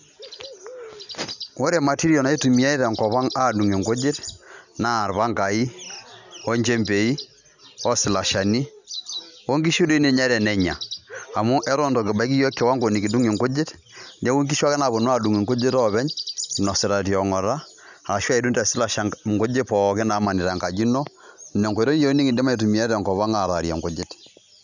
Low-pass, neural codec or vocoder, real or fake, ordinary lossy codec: 7.2 kHz; none; real; none